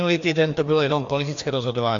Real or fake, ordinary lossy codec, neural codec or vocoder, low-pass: fake; AAC, 64 kbps; codec, 16 kHz, 2 kbps, FreqCodec, larger model; 7.2 kHz